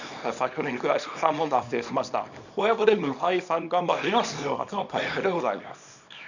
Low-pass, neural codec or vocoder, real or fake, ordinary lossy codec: 7.2 kHz; codec, 24 kHz, 0.9 kbps, WavTokenizer, small release; fake; none